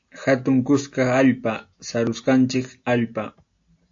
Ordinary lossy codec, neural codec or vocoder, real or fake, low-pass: AAC, 48 kbps; none; real; 7.2 kHz